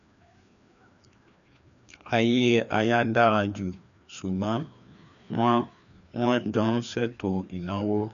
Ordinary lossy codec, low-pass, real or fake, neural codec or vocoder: none; 7.2 kHz; fake; codec, 16 kHz, 2 kbps, FreqCodec, larger model